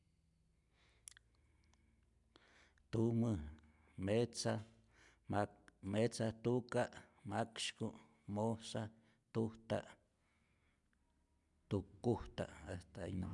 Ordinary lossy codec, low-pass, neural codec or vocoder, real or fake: MP3, 96 kbps; 10.8 kHz; none; real